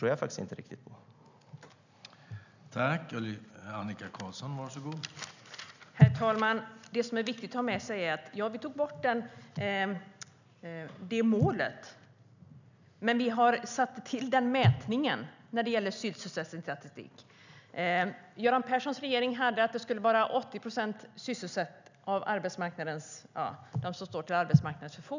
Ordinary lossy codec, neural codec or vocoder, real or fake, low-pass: none; none; real; 7.2 kHz